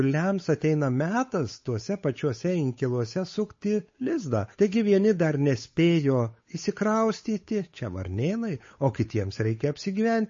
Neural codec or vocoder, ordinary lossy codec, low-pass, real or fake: codec, 16 kHz, 8 kbps, FunCodec, trained on Chinese and English, 25 frames a second; MP3, 32 kbps; 7.2 kHz; fake